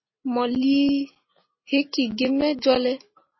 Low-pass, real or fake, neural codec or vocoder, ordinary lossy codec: 7.2 kHz; real; none; MP3, 24 kbps